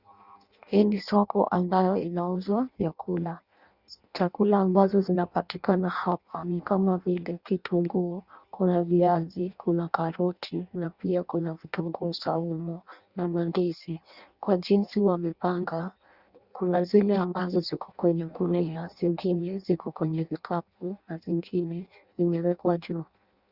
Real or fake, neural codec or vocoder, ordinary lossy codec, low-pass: fake; codec, 16 kHz in and 24 kHz out, 0.6 kbps, FireRedTTS-2 codec; Opus, 64 kbps; 5.4 kHz